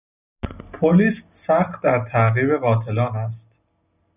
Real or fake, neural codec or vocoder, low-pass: real; none; 3.6 kHz